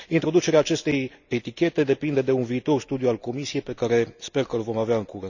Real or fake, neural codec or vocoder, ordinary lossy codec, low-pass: real; none; none; 7.2 kHz